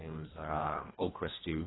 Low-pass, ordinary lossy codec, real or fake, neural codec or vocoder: 7.2 kHz; AAC, 16 kbps; fake; codec, 24 kHz, 1.5 kbps, HILCodec